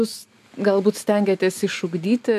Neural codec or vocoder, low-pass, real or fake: none; 14.4 kHz; real